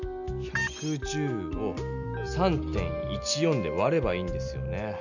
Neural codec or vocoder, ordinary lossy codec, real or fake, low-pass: none; none; real; 7.2 kHz